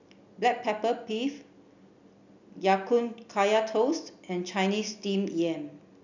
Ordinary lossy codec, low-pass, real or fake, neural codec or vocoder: none; 7.2 kHz; real; none